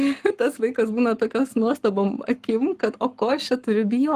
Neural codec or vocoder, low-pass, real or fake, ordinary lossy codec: codec, 44.1 kHz, 7.8 kbps, Pupu-Codec; 14.4 kHz; fake; Opus, 24 kbps